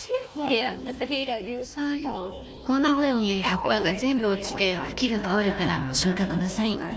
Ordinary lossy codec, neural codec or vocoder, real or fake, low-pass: none; codec, 16 kHz, 1 kbps, FunCodec, trained on Chinese and English, 50 frames a second; fake; none